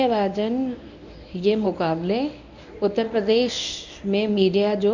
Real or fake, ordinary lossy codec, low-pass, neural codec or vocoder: fake; none; 7.2 kHz; codec, 24 kHz, 0.9 kbps, WavTokenizer, medium speech release version 1